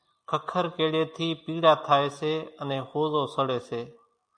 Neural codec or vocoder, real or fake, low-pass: none; real; 9.9 kHz